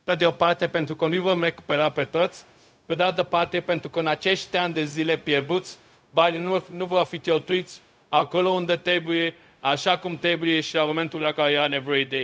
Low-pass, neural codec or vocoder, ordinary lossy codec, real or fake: none; codec, 16 kHz, 0.4 kbps, LongCat-Audio-Codec; none; fake